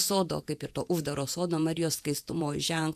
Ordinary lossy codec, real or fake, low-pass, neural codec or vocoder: AAC, 96 kbps; fake; 14.4 kHz; vocoder, 48 kHz, 128 mel bands, Vocos